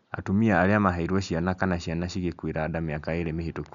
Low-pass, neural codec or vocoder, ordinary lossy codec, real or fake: 7.2 kHz; none; none; real